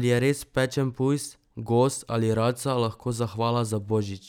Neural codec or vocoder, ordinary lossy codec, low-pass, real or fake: none; none; 19.8 kHz; real